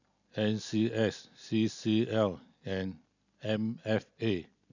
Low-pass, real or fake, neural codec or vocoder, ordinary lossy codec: 7.2 kHz; real; none; none